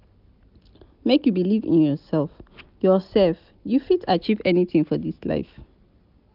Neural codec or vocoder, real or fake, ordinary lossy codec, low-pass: none; real; none; 5.4 kHz